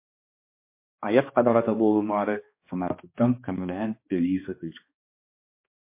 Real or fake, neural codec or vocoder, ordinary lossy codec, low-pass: fake; codec, 16 kHz, 2 kbps, X-Codec, HuBERT features, trained on balanced general audio; MP3, 24 kbps; 3.6 kHz